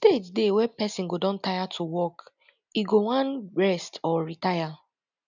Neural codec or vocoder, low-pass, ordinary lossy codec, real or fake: none; 7.2 kHz; none; real